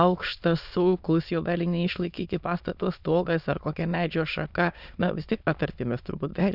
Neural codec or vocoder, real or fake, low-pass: autoencoder, 22.05 kHz, a latent of 192 numbers a frame, VITS, trained on many speakers; fake; 5.4 kHz